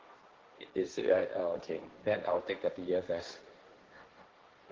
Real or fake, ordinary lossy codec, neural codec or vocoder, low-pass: fake; Opus, 16 kbps; codec, 16 kHz, 1.1 kbps, Voila-Tokenizer; 7.2 kHz